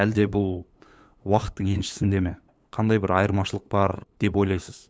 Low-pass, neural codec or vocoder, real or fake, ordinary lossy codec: none; codec, 16 kHz, 8 kbps, FunCodec, trained on LibriTTS, 25 frames a second; fake; none